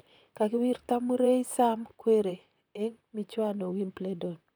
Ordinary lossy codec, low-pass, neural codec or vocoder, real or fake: none; none; vocoder, 44.1 kHz, 128 mel bands, Pupu-Vocoder; fake